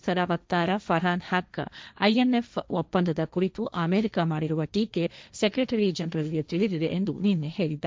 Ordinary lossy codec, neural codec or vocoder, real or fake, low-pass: none; codec, 16 kHz, 1.1 kbps, Voila-Tokenizer; fake; none